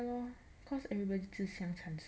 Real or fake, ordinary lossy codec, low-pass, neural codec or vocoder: real; none; none; none